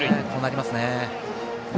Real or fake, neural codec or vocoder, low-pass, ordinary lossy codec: real; none; none; none